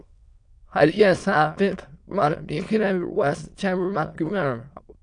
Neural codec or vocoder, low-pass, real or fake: autoencoder, 22.05 kHz, a latent of 192 numbers a frame, VITS, trained on many speakers; 9.9 kHz; fake